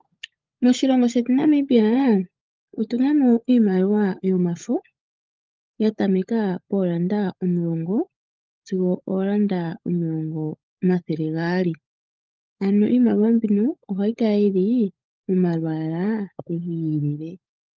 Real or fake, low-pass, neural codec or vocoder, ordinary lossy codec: fake; 7.2 kHz; codec, 16 kHz, 16 kbps, FunCodec, trained on LibriTTS, 50 frames a second; Opus, 32 kbps